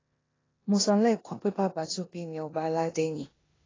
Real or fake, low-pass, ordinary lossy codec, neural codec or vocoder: fake; 7.2 kHz; AAC, 32 kbps; codec, 16 kHz in and 24 kHz out, 0.9 kbps, LongCat-Audio-Codec, four codebook decoder